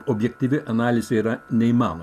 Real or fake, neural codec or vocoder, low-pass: real; none; 14.4 kHz